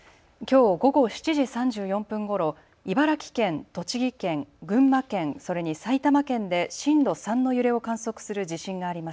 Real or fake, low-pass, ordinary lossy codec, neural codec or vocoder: real; none; none; none